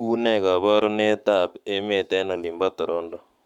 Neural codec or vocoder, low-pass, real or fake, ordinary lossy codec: codec, 44.1 kHz, 7.8 kbps, Pupu-Codec; 19.8 kHz; fake; none